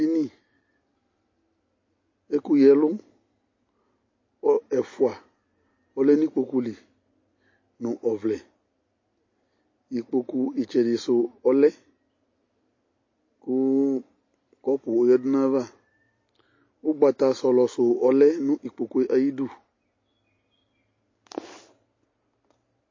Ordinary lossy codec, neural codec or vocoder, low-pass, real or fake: MP3, 32 kbps; none; 7.2 kHz; real